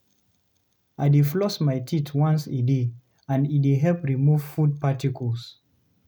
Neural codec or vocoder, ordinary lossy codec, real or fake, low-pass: none; none; real; none